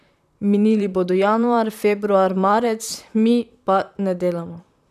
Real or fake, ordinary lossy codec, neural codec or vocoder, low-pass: fake; none; vocoder, 44.1 kHz, 128 mel bands, Pupu-Vocoder; 14.4 kHz